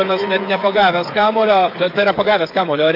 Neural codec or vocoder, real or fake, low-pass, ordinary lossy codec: codec, 16 kHz, 8 kbps, FreqCodec, smaller model; fake; 5.4 kHz; AAC, 32 kbps